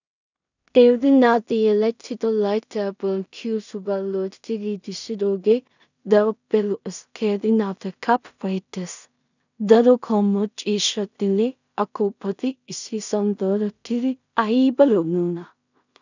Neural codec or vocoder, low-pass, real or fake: codec, 16 kHz in and 24 kHz out, 0.4 kbps, LongCat-Audio-Codec, two codebook decoder; 7.2 kHz; fake